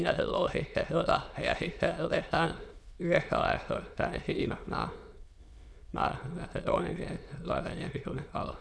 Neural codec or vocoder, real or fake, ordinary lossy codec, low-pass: autoencoder, 22.05 kHz, a latent of 192 numbers a frame, VITS, trained on many speakers; fake; none; none